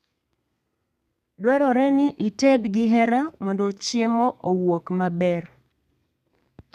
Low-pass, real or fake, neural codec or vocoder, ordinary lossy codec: 14.4 kHz; fake; codec, 32 kHz, 1.9 kbps, SNAC; none